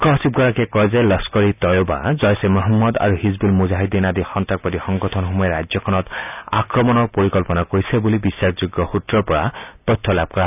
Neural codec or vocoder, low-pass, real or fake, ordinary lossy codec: none; 3.6 kHz; real; none